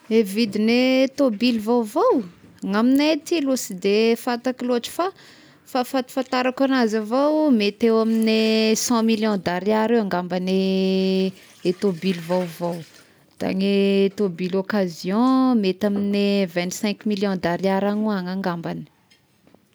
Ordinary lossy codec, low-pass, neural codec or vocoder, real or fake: none; none; none; real